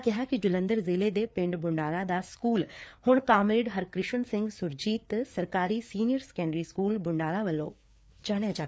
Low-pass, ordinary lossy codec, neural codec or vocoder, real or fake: none; none; codec, 16 kHz, 4 kbps, FreqCodec, larger model; fake